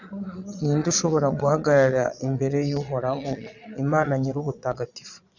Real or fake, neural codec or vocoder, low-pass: fake; vocoder, 22.05 kHz, 80 mel bands, Vocos; 7.2 kHz